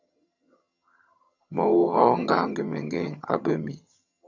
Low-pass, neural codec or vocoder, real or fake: 7.2 kHz; vocoder, 22.05 kHz, 80 mel bands, HiFi-GAN; fake